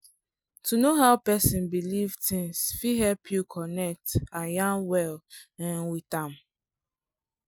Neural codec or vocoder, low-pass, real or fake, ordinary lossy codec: none; none; real; none